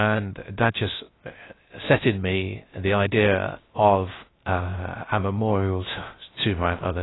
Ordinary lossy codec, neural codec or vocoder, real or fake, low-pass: AAC, 16 kbps; codec, 16 kHz, 0.3 kbps, FocalCodec; fake; 7.2 kHz